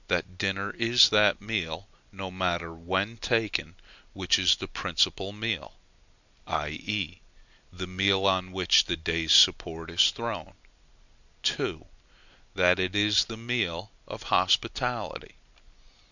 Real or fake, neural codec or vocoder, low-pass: real; none; 7.2 kHz